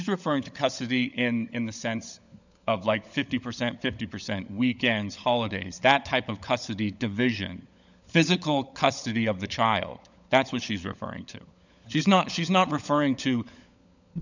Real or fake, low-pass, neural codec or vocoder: fake; 7.2 kHz; codec, 16 kHz, 16 kbps, FunCodec, trained on LibriTTS, 50 frames a second